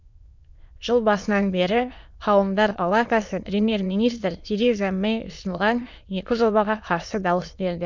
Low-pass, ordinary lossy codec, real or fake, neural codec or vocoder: 7.2 kHz; none; fake; autoencoder, 22.05 kHz, a latent of 192 numbers a frame, VITS, trained on many speakers